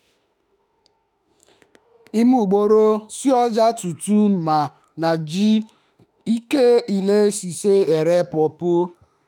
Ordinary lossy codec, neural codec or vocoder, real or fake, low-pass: none; autoencoder, 48 kHz, 32 numbers a frame, DAC-VAE, trained on Japanese speech; fake; 19.8 kHz